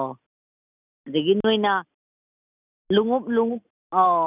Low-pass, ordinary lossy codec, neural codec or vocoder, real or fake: 3.6 kHz; none; none; real